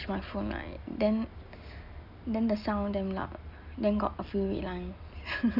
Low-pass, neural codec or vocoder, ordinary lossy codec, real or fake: 5.4 kHz; none; none; real